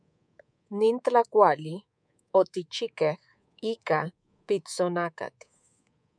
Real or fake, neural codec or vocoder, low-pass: fake; codec, 24 kHz, 3.1 kbps, DualCodec; 9.9 kHz